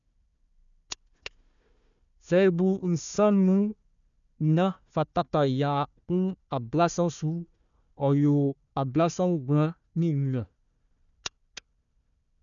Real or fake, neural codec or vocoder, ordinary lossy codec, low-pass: fake; codec, 16 kHz, 1 kbps, FunCodec, trained on Chinese and English, 50 frames a second; none; 7.2 kHz